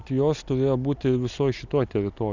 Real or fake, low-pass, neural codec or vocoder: real; 7.2 kHz; none